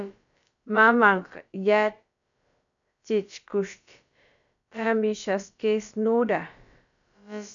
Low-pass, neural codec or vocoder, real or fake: 7.2 kHz; codec, 16 kHz, about 1 kbps, DyCAST, with the encoder's durations; fake